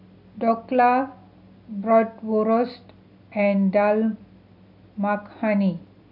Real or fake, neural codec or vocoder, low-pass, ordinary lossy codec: real; none; 5.4 kHz; none